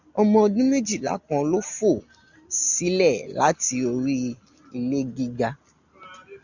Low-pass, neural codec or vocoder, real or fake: 7.2 kHz; none; real